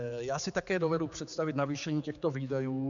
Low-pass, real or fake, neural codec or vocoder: 7.2 kHz; fake; codec, 16 kHz, 4 kbps, X-Codec, HuBERT features, trained on general audio